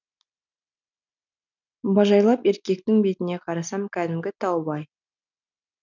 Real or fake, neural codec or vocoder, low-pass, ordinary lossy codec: real; none; 7.2 kHz; none